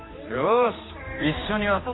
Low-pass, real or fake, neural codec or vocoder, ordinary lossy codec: 7.2 kHz; fake; codec, 16 kHz, 2 kbps, X-Codec, HuBERT features, trained on general audio; AAC, 16 kbps